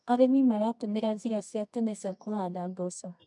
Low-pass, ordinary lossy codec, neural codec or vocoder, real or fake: 10.8 kHz; MP3, 96 kbps; codec, 24 kHz, 0.9 kbps, WavTokenizer, medium music audio release; fake